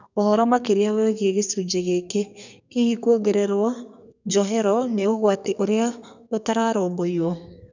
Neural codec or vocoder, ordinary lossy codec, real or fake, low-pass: codec, 32 kHz, 1.9 kbps, SNAC; none; fake; 7.2 kHz